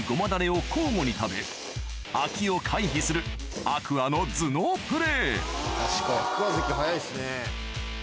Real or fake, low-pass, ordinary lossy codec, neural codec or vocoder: real; none; none; none